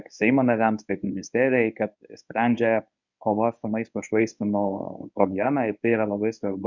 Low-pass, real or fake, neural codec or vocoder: 7.2 kHz; fake; codec, 24 kHz, 0.9 kbps, WavTokenizer, medium speech release version 1